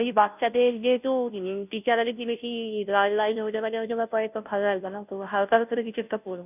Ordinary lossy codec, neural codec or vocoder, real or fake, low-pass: none; codec, 16 kHz, 0.5 kbps, FunCodec, trained on Chinese and English, 25 frames a second; fake; 3.6 kHz